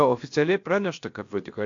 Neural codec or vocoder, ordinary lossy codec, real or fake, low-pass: codec, 16 kHz, about 1 kbps, DyCAST, with the encoder's durations; AAC, 48 kbps; fake; 7.2 kHz